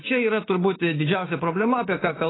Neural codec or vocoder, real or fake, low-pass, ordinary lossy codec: codec, 16 kHz, 6 kbps, DAC; fake; 7.2 kHz; AAC, 16 kbps